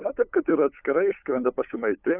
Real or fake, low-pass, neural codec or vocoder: fake; 3.6 kHz; codec, 16 kHz, 8 kbps, FunCodec, trained on LibriTTS, 25 frames a second